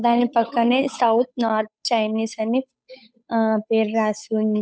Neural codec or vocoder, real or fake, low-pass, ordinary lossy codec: codec, 16 kHz, 8 kbps, FunCodec, trained on Chinese and English, 25 frames a second; fake; none; none